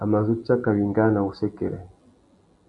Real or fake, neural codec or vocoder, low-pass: real; none; 10.8 kHz